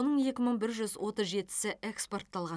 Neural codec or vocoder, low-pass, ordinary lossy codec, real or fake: none; none; none; real